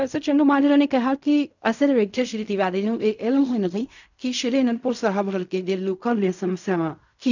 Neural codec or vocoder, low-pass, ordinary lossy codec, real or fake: codec, 16 kHz in and 24 kHz out, 0.4 kbps, LongCat-Audio-Codec, fine tuned four codebook decoder; 7.2 kHz; none; fake